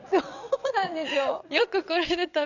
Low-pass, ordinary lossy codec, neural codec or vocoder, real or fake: 7.2 kHz; none; vocoder, 22.05 kHz, 80 mel bands, WaveNeXt; fake